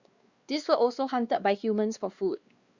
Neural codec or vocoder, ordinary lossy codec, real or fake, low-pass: codec, 16 kHz, 2 kbps, X-Codec, WavLM features, trained on Multilingual LibriSpeech; Opus, 64 kbps; fake; 7.2 kHz